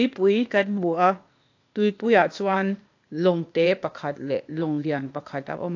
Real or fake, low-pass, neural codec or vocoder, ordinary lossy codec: fake; 7.2 kHz; codec, 16 kHz, 0.8 kbps, ZipCodec; AAC, 48 kbps